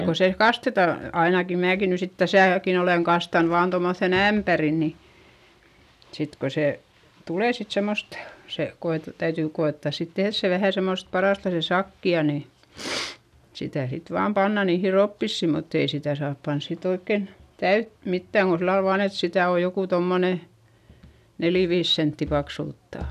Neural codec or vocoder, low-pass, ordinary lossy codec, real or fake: none; 14.4 kHz; none; real